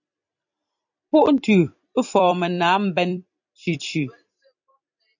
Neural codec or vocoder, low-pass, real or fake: vocoder, 44.1 kHz, 128 mel bands every 256 samples, BigVGAN v2; 7.2 kHz; fake